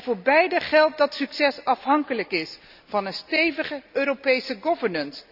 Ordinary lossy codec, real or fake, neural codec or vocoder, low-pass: none; real; none; 5.4 kHz